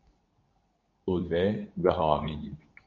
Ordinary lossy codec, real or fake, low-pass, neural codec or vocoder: MP3, 64 kbps; fake; 7.2 kHz; codec, 24 kHz, 0.9 kbps, WavTokenizer, medium speech release version 2